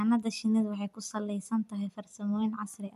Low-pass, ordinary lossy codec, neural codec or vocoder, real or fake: 14.4 kHz; none; vocoder, 44.1 kHz, 128 mel bands every 256 samples, BigVGAN v2; fake